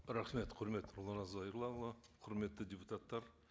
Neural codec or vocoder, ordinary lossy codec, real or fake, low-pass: none; none; real; none